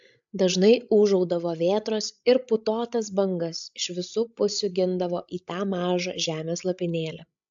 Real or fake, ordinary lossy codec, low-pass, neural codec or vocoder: fake; AAC, 64 kbps; 7.2 kHz; codec, 16 kHz, 16 kbps, FreqCodec, larger model